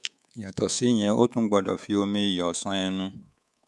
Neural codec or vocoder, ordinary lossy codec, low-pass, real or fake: codec, 24 kHz, 3.1 kbps, DualCodec; none; none; fake